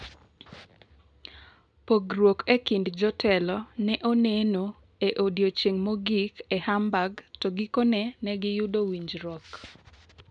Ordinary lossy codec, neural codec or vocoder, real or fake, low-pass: none; none; real; 9.9 kHz